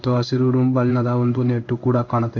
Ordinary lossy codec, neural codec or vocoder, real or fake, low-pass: none; codec, 16 kHz in and 24 kHz out, 1 kbps, XY-Tokenizer; fake; 7.2 kHz